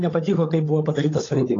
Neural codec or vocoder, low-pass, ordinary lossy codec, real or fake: codec, 16 kHz, 16 kbps, FunCodec, trained on LibriTTS, 50 frames a second; 7.2 kHz; AAC, 32 kbps; fake